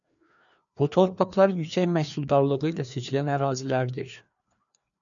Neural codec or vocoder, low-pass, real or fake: codec, 16 kHz, 2 kbps, FreqCodec, larger model; 7.2 kHz; fake